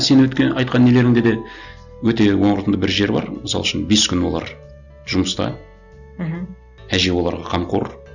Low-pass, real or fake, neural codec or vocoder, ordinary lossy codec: 7.2 kHz; real; none; none